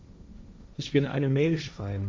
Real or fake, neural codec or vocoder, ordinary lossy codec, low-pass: fake; codec, 16 kHz, 1.1 kbps, Voila-Tokenizer; none; none